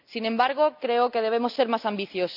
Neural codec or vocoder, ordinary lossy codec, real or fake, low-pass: none; none; real; 5.4 kHz